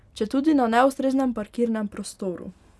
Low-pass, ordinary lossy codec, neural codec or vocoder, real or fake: none; none; none; real